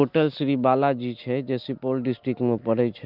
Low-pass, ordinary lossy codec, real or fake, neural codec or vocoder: 5.4 kHz; Opus, 24 kbps; real; none